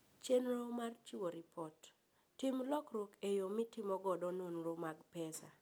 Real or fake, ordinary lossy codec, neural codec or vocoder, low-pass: real; none; none; none